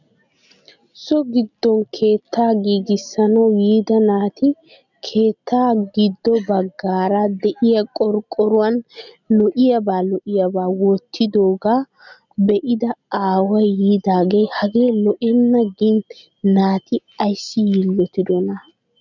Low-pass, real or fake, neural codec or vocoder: 7.2 kHz; real; none